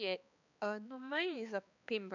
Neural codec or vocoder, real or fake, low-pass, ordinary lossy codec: codec, 16 kHz, 2 kbps, X-Codec, HuBERT features, trained on balanced general audio; fake; 7.2 kHz; none